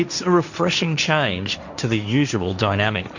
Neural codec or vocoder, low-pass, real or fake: codec, 16 kHz, 1.1 kbps, Voila-Tokenizer; 7.2 kHz; fake